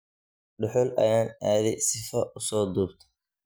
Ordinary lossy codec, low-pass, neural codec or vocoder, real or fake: none; none; none; real